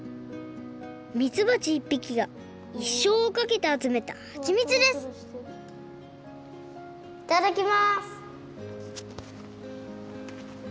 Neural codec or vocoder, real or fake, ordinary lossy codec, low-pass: none; real; none; none